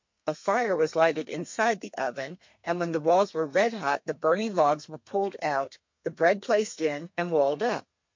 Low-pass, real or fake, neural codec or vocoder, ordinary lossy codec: 7.2 kHz; fake; codec, 32 kHz, 1.9 kbps, SNAC; MP3, 48 kbps